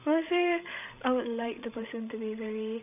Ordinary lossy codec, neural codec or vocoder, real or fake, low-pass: none; codec, 16 kHz, 16 kbps, FreqCodec, larger model; fake; 3.6 kHz